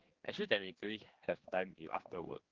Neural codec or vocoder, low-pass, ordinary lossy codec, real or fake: codec, 16 kHz, 2 kbps, X-Codec, HuBERT features, trained on general audio; 7.2 kHz; Opus, 16 kbps; fake